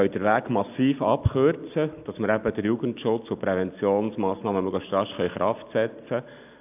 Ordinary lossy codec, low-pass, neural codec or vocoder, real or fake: AAC, 32 kbps; 3.6 kHz; none; real